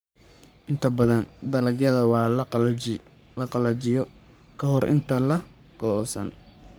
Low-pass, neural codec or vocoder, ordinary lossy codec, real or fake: none; codec, 44.1 kHz, 3.4 kbps, Pupu-Codec; none; fake